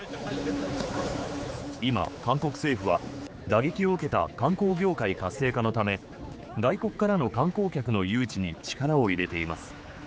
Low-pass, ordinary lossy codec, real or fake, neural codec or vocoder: none; none; fake; codec, 16 kHz, 4 kbps, X-Codec, HuBERT features, trained on general audio